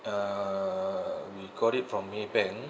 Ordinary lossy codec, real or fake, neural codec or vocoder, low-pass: none; real; none; none